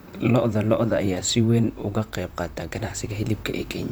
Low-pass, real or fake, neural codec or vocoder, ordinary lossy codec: none; fake; vocoder, 44.1 kHz, 128 mel bands, Pupu-Vocoder; none